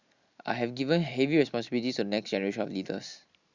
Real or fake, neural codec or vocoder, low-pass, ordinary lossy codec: real; none; 7.2 kHz; Opus, 64 kbps